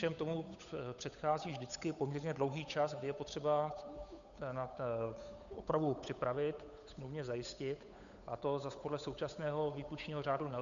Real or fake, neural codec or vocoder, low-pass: fake; codec, 16 kHz, 8 kbps, FunCodec, trained on Chinese and English, 25 frames a second; 7.2 kHz